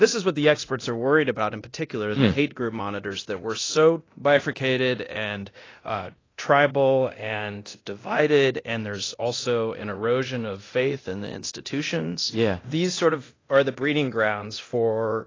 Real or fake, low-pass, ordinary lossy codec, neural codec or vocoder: fake; 7.2 kHz; AAC, 32 kbps; codec, 24 kHz, 0.9 kbps, DualCodec